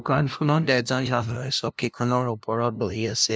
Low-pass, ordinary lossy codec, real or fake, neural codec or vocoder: none; none; fake; codec, 16 kHz, 0.5 kbps, FunCodec, trained on LibriTTS, 25 frames a second